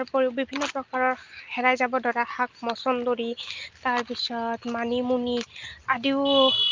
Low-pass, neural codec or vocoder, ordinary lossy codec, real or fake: 7.2 kHz; none; Opus, 24 kbps; real